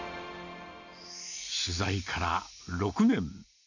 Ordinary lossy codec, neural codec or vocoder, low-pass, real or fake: none; none; 7.2 kHz; real